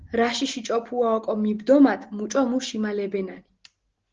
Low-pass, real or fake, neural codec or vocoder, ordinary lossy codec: 7.2 kHz; real; none; Opus, 16 kbps